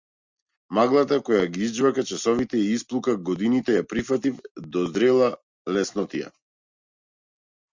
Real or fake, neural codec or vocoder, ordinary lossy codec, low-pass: real; none; Opus, 64 kbps; 7.2 kHz